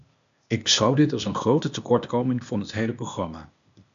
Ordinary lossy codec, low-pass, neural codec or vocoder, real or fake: MP3, 64 kbps; 7.2 kHz; codec, 16 kHz, 0.8 kbps, ZipCodec; fake